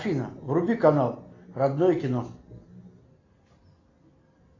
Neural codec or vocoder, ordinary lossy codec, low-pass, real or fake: none; AAC, 32 kbps; 7.2 kHz; real